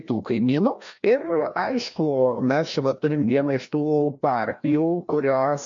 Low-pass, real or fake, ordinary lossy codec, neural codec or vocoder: 7.2 kHz; fake; MP3, 48 kbps; codec, 16 kHz, 1 kbps, FreqCodec, larger model